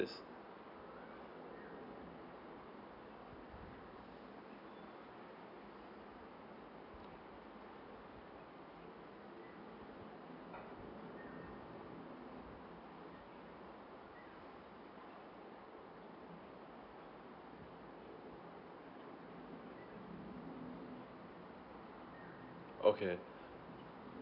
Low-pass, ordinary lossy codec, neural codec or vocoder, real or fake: 5.4 kHz; none; none; real